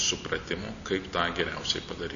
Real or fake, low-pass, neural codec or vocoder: real; 7.2 kHz; none